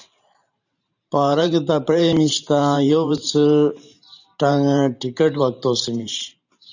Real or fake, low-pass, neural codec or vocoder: fake; 7.2 kHz; vocoder, 44.1 kHz, 128 mel bands every 512 samples, BigVGAN v2